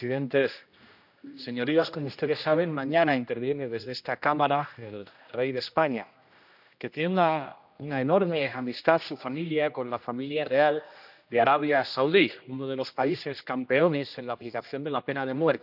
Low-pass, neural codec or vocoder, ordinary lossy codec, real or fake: 5.4 kHz; codec, 16 kHz, 1 kbps, X-Codec, HuBERT features, trained on general audio; none; fake